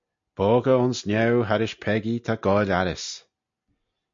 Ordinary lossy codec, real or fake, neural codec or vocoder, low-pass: MP3, 48 kbps; real; none; 7.2 kHz